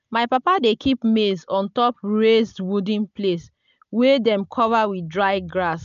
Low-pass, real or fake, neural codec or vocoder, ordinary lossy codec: 7.2 kHz; real; none; none